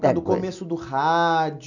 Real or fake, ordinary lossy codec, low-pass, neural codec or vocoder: real; none; 7.2 kHz; none